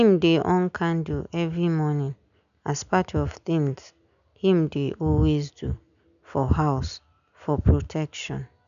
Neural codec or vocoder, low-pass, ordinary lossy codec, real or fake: none; 7.2 kHz; none; real